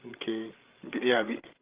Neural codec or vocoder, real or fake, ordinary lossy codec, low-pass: codec, 16 kHz, 16 kbps, FreqCodec, larger model; fake; Opus, 32 kbps; 3.6 kHz